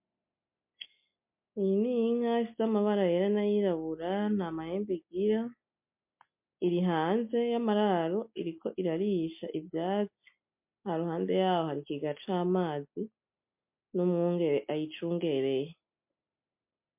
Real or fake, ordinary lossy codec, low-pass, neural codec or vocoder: real; MP3, 32 kbps; 3.6 kHz; none